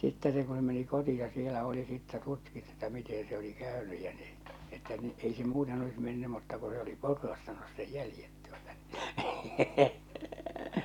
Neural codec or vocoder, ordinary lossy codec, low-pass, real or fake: none; none; 19.8 kHz; real